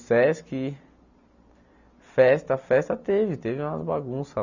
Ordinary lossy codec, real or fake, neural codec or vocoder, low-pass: none; real; none; 7.2 kHz